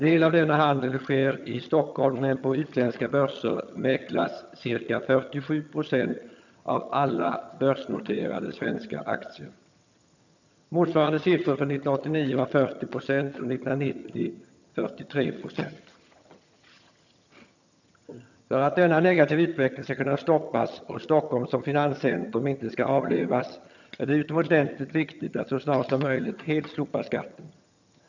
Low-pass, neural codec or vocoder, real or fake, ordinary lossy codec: 7.2 kHz; vocoder, 22.05 kHz, 80 mel bands, HiFi-GAN; fake; none